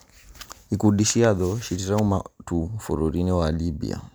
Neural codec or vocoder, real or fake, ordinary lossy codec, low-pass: none; real; none; none